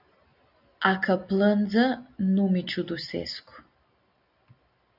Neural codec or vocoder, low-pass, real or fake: none; 5.4 kHz; real